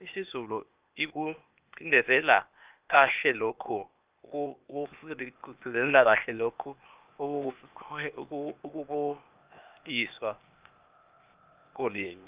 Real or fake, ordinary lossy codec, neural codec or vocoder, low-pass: fake; Opus, 24 kbps; codec, 16 kHz, 0.8 kbps, ZipCodec; 3.6 kHz